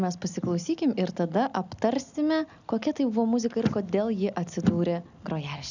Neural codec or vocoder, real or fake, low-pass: none; real; 7.2 kHz